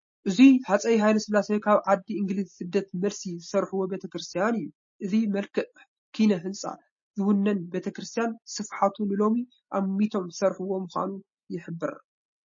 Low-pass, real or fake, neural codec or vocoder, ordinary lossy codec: 7.2 kHz; real; none; MP3, 32 kbps